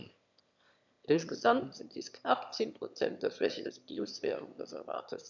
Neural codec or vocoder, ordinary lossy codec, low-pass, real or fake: autoencoder, 22.05 kHz, a latent of 192 numbers a frame, VITS, trained on one speaker; none; 7.2 kHz; fake